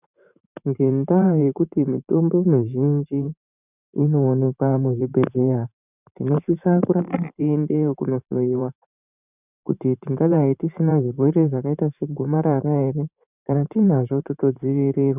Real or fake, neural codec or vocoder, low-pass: fake; vocoder, 44.1 kHz, 128 mel bands every 512 samples, BigVGAN v2; 3.6 kHz